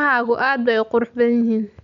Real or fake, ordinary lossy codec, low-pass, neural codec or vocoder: real; none; 7.2 kHz; none